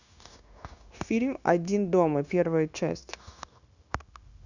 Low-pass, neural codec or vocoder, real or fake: 7.2 kHz; codec, 16 kHz, 0.9 kbps, LongCat-Audio-Codec; fake